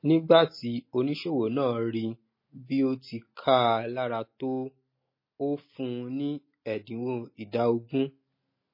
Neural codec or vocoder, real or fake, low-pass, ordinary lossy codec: none; real; 5.4 kHz; MP3, 24 kbps